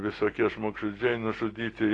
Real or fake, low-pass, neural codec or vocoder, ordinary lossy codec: real; 9.9 kHz; none; AAC, 32 kbps